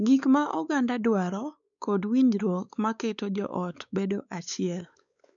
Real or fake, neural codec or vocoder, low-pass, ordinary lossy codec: fake; codec, 16 kHz, 4 kbps, X-Codec, WavLM features, trained on Multilingual LibriSpeech; 7.2 kHz; none